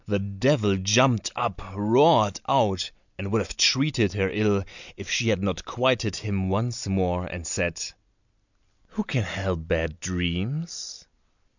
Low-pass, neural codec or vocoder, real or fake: 7.2 kHz; none; real